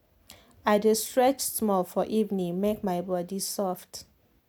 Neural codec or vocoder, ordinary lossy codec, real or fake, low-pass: none; none; real; none